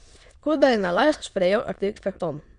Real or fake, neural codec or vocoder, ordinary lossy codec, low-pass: fake; autoencoder, 22.05 kHz, a latent of 192 numbers a frame, VITS, trained on many speakers; Opus, 64 kbps; 9.9 kHz